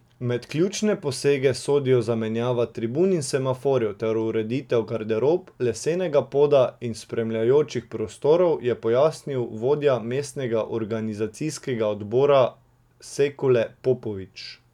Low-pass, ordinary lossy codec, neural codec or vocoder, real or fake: 19.8 kHz; none; none; real